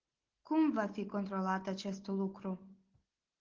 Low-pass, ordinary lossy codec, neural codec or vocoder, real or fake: 7.2 kHz; Opus, 16 kbps; none; real